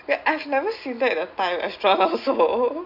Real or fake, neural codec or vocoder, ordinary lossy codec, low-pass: real; none; none; 5.4 kHz